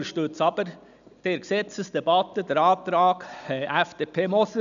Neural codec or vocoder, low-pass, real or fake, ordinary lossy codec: none; 7.2 kHz; real; none